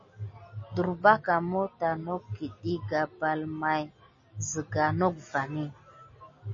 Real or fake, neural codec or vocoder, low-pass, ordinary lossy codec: real; none; 7.2 kHz; MP3, 32 kbps